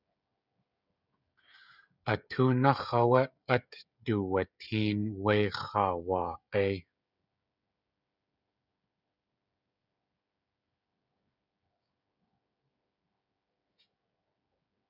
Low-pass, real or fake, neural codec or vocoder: 5.4 kHz; fake; codec, 16 kHz, 16 kbps, FreqCodec, smaller model